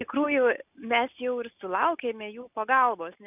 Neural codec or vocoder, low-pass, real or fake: none; 3.6 kHz; real